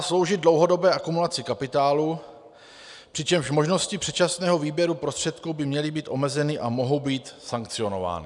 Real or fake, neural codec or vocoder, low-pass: real; none; 10.8 kHz